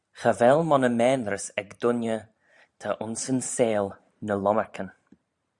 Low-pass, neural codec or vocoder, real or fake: 10.8 kHz; vocoder, 44.1 kHz, 128 mel bands every 512 samples, BigVGAN v2; fake